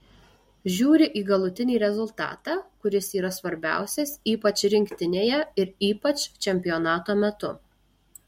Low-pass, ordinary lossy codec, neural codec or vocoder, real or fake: 19.8 kHz; MP3, 64 kbps; none; real